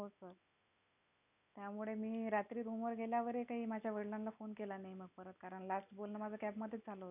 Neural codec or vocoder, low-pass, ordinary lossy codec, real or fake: none; 3.6 kHz; none; real